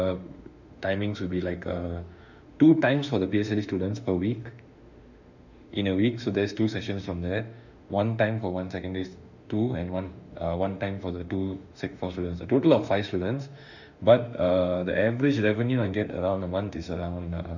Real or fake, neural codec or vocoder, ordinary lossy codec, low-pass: fake; autoencoder, 48 kHz, 32 numbers a frame, DAC-VAE, trained on Japanese speech; none; 7.2 kHz